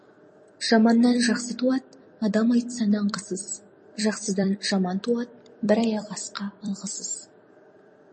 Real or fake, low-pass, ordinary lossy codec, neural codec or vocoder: fake; 10.8 kHz; MP3, 32 kbps; vocoder, 44.1 kHz, 128 mel bands every 256 samples, BigVGAN v2